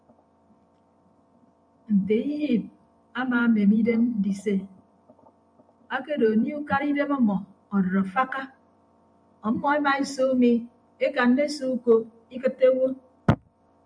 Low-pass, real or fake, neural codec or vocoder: 9.9 kHz; fake; vocoder, 44.1 kHz, 128 mel bands every 512 samples, BigVGAN v2